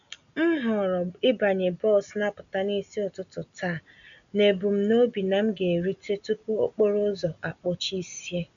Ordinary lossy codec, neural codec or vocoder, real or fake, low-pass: none; none; real; 7.2 kHz